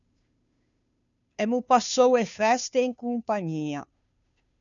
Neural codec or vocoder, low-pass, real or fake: codec, 16 kHz, 2 kbps, FunCodec, trained on Chinese and English, 25 frames a second; 7.2 kHz; fake